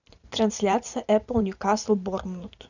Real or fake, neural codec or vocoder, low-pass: fake; vocoder, 44.1 kHz, 128 mel bands every 512 samples, BigVGAN v2; 7.2 kHz